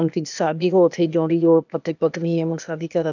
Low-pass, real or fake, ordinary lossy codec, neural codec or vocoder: 7.2 kHz; fake; none; codec, 16 kHz, 0.8 kbps, ZipCodec